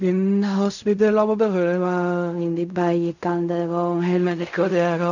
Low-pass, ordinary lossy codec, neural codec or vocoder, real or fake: 7.2 kHz; none; codec, 16 kHz in and 24 kHz out, 0.4 kbps, LongCat-Audio-Codec, fine tuned four codebook decoder; fake